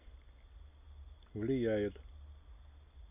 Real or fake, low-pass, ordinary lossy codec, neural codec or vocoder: real; 3.6 kHz; none; none